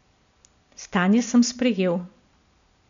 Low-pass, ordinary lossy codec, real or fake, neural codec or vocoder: 7.2 kHz; none; real; none